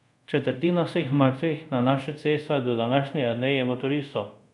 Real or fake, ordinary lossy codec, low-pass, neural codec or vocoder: fake; none; 10.8 kHz; codec, 24 kHz, 0.5 kbps, DualCodec